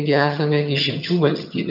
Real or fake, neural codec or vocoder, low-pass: fake; vocoder, 22.05 kHz, 80 mel bands, HiFi-GAN; 5.4 kHz